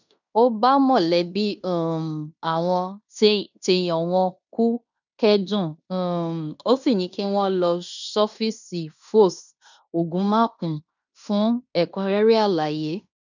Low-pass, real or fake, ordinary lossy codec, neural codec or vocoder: 7.2 kHz; fake; none; codec, 16 kHz in and 24 kHz out, 0.9 kbps, LongCat-Audio-Codec, fine tuned four codebook decoder